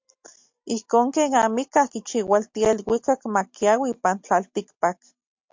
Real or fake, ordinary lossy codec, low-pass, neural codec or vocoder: real; MP3, 48 kbps; 7.2 kHz; none